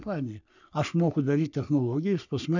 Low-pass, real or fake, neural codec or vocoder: 7.2 kHz; fake; codec, 44.1 kHz, 3.4 kbps, Pupu-Codec